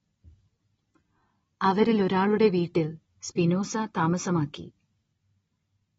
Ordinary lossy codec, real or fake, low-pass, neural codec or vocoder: AAC, 24 kbps; real; 19.8 kHz; none